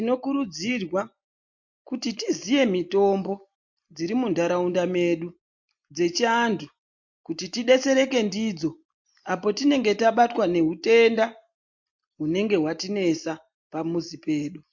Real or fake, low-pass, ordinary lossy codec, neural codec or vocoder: real; 7.2 kHz; MP3, 48 kbps; none